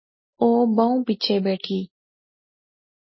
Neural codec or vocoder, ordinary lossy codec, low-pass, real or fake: none; MP3, 24 kbps; 7.2 kHz; real